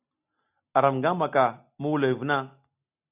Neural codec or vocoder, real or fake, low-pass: none; real; 3.6 kHz